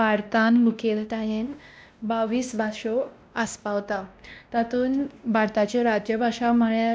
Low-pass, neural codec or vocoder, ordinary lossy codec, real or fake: none; codec, 16 kHz, 1 kbps, X-Codec, WavLM features, trained on Multilingual LibriSpeech; none; fake